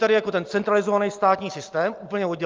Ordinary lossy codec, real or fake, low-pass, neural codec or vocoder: Opus, 24 kbps; real; 7.2 kHz; none